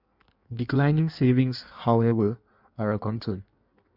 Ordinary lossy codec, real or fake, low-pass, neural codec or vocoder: MP3, 48 kbps; fake; 5.4 kHz; codec, 16 kHz in and 24 kHz out, 1.1 kbps, FireRedTTS-2 codec